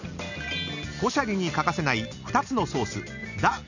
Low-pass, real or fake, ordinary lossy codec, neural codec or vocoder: 7.2 kHz; real; none; none